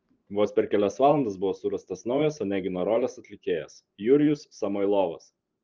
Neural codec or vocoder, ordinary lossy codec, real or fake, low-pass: vocoder, 44.1 kHz, 128 mel bands every 512 samples, BigVGAN v2; Opus, 24 kbps; fake; 7.2 kHz